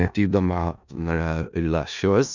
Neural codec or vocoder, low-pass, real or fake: codec, 16 kHz in and 24 kHz out, 0.4 kbps, LongCat-Audio-Codec, four codebook decoder; 7.2 kHz; fake